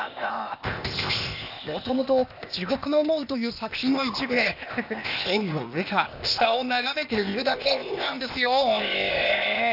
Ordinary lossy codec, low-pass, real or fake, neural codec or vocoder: none; 5.4 kHz; fake; codec, 16 kHz, 0.8 kbps, ZipCodec